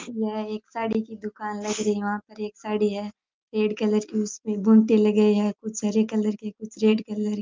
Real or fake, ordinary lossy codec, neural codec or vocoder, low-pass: real; Opus, 24 kbps; none; 7.2 kHz